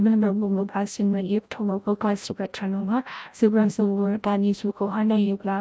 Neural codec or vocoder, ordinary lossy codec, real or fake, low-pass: codec, 16 kHz, 0.5 kbps, FreqCodec, larger model; none; fake; none